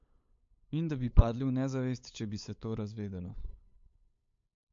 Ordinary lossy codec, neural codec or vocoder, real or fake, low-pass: MP3, 48 kbps; codec, 16 kHz, 8 kbps, FunCodec, trained on LibriTTS, 25 frames a second; fake; 7.2 kHz